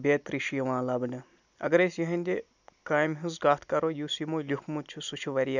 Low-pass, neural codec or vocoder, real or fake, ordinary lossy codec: 7.2 kHz; none; real; none